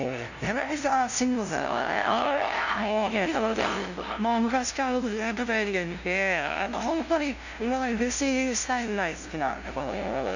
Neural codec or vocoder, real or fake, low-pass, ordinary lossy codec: codec, 16 kHz, 0.5 kbps, FunCodec, trained on LibriTTS, 25 frames a second; fake; 7.2 kHz; none